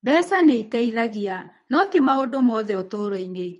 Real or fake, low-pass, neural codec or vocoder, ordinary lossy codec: fake; 10.8 kHz; codec, 24 kHz, 3 kbps, HILCodec; MP3, 48 kbps